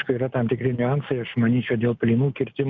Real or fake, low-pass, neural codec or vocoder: real; 7.2 kHz; none